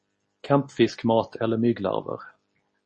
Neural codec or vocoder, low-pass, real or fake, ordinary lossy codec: none; 10.8 kHz; real; MP3, 32 kbps